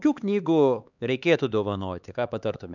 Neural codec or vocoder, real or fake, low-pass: codec, 16 kHz, 4 kbps, X-Codec, HuBERT features, trained on LibriSpeech; fake; 7.2 kHz